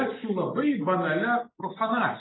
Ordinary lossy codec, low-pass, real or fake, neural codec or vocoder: AAC, 16 kbps; 7.2 kHz; real; none